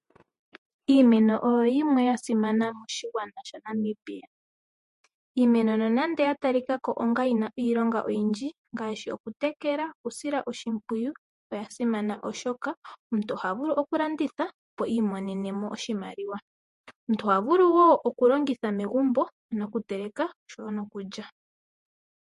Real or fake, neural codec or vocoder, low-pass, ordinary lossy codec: fake; vocoder, 48 kHz, 128 mel bands, Vocos; 14.4 kHz; MP3, 48 kbps